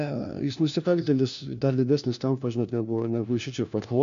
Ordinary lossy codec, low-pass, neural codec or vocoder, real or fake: MP3, 64 kbps; 7.2 kHz; codec, 16 kHz, 1 kbps, FunCodec, trained on LibriTTS, 50 frames a second; fake